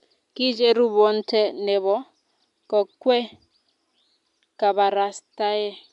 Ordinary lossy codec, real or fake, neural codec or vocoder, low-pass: AAC, 96 kbps; real; none; 10.8 kHz